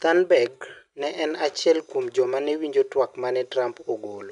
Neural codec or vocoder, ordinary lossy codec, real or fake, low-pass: none; none; real; 10.8 kHz